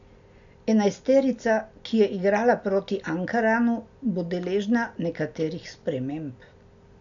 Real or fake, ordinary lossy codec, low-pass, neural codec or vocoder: real; none; 7.2 kHz; none